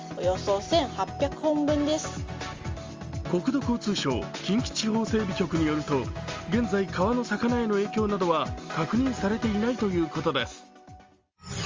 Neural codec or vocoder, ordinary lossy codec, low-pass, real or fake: none; Opus, 32 kbps; 7.2 kHz; real